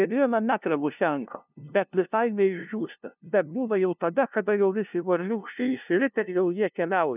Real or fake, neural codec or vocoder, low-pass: fake; codec, 16 kHz, 0.5 kbps, FunCodec, trained on LibriTTS, 25 frames a second; 3.6 kHz